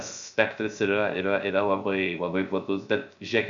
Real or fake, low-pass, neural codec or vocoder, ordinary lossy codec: fake; 7.2 kHz; codec, 16 kHz, 0.3 kbps, FocalCodec; MP3, 96 kbps